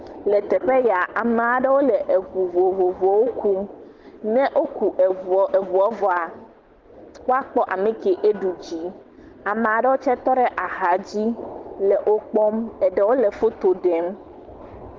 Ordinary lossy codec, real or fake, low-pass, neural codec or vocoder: Opus, 16 kbps; real; 7.2 kHz; none